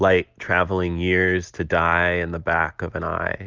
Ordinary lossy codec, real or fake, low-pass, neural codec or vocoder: Opus, 32 kbps; real; 7.2 kHz; none